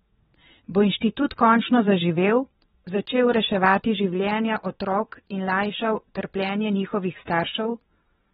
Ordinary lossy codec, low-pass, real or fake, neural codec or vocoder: AAC, 16 kbps; 9.9 kHz; fake; vocoder, 22.05 kHz, 80 mel bands, WaveNeXt